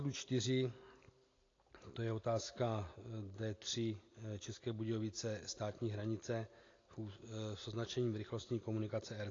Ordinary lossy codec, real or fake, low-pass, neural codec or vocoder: AAC, 32 kbps; real; 7.2 kHz; none